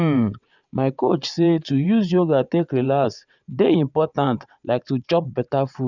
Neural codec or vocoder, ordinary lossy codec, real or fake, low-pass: vocoder, 22.05 kHz, 80 mel bands, WaveNeXt; none; fake; 7.2 kHz